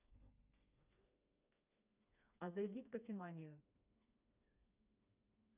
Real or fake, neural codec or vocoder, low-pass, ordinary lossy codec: fake; codec, 16 kHz, 2 kbps, FreqCodec, smaller model; 3.6 kHz; none